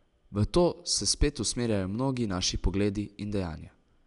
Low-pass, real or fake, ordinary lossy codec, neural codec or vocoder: 10.8 kHz; real; none; none